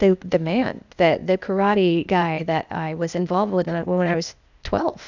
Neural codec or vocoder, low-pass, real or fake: codec, 16 kHz, 0.8 kbps, ZipCodec; 7.2 kHz; fake